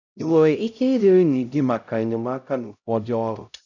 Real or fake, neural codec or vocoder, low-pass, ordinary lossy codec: fake; codec, 16 kHz, 0.5 kbps, X-Codec, HuBERT features, trained on LibriSpeech; 7.2 kHz; none